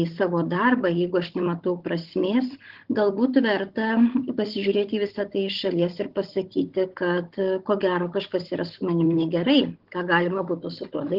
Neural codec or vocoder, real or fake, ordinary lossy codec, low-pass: codec, 16 kHz, 16 kbps, FunCodec, trained on Chinese and English, 50 frames a second; fake; Opus, 16 kbps; 5.4 kHz